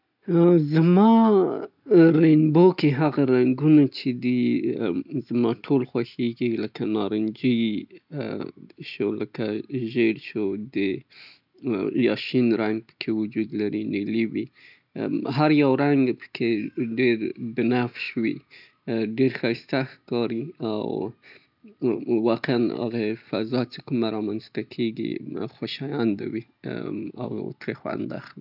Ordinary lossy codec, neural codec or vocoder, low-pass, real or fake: none; none; 5.4 kHz; real